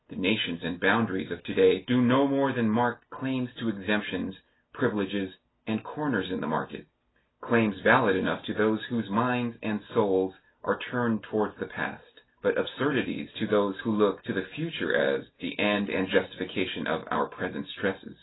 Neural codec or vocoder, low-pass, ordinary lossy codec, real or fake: none; 7.2 kHz; AAC, 16 kbps; real